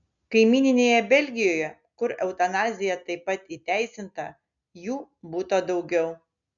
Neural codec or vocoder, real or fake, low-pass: none; real; 7.2 kHz